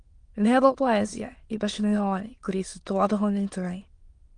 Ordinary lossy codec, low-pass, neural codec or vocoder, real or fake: Opus, 24 kbps; 9.9 kHz; autoencoder, 22.05 kHz, a latent of 192 numbers a frame, VITS, trained on many speakers; fake